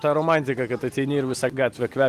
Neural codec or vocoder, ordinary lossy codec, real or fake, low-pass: none; Opus, 24 kbps; real; 14.4 kHz